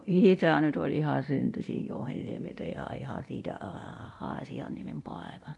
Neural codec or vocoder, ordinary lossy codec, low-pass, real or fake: codec, 24 kHz, 0.9 kbps, DualCodec; none; 10.8 kHz; fake